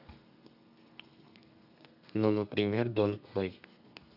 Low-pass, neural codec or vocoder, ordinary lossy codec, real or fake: 5.4 kHz; codec, 32 kHz, 1.9 kbps, SNAC; none; fake